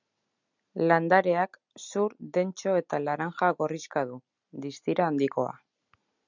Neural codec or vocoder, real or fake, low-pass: none; real; 7.2 kHz